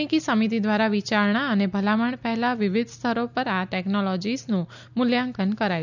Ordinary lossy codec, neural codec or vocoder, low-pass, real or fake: none; none; 7.2 kHz; real